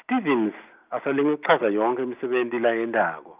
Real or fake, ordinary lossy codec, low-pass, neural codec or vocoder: real; Opus, 24 kbps; 3.6 kHz; none